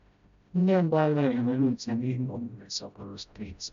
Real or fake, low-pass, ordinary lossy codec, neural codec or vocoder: fake; 7.2 kHz; MP3, 64 kbps; codec, 16 kHz, 0.5 kbps, FreqCodec, smaller model